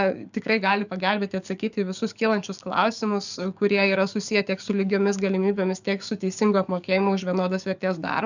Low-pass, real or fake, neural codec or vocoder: 7.2 kHz; fake; codec, 44.1 kHz, 7.8 kbps, DAC